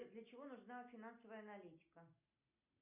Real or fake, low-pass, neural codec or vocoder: real; 3.6 kHz; none